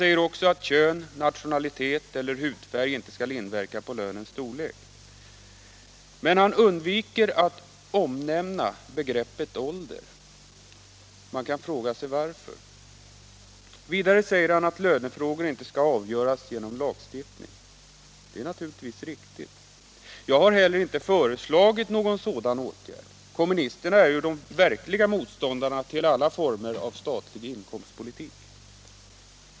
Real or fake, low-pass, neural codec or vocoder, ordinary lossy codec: real; none; none; none